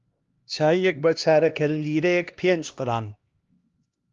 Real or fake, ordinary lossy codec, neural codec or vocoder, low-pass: fake; Opus, 32 kbps; codec, 16 kHz, 1 kbps, X-Codec, HuBERT features, trained on LibriSpeech; 7.2 kHz